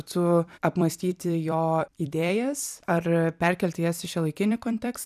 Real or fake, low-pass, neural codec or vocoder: fake; 14.4 kHz; vocoder, 44.1 kHz, 128 mel bands every 256 samples, BigVGAN v2